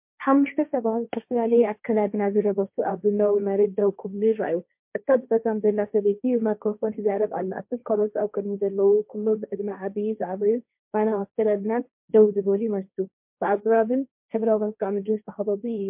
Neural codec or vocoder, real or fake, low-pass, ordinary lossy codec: codec, 16 kHz, 1.1 kbps, Voila-Tokenizer; fake; 3.6 kHz; MP3, 32 kbps